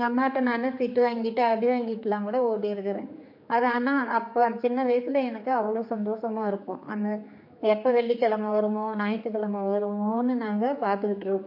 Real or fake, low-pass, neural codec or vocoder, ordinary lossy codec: fake; 5.4 kHz; codec, 16 kHz, 4 kbps, X-Codec, HuBERT features, trained on general audio; MP3, 32 kbps